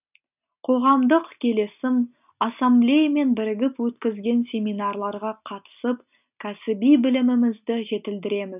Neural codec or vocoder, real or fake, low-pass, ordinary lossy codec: none; real; 3.6 kHz; none